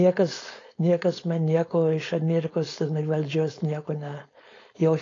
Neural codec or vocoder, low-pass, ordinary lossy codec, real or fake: codec, 16 kHz, 4.8 kbps, FACodec; 7.2 kHz; AAC, 32 kbps; fake